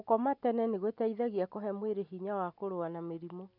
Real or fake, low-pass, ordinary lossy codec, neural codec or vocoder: real; 5.4 kHz; none; none